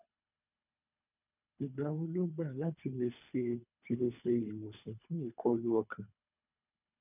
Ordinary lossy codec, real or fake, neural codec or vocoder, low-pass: none; fake; codec, 24 kHz, 3 kbps, HILCodec; 3.6 kHz